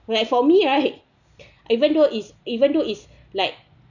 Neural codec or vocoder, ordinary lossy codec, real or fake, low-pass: none; none; real; 7.2 kHz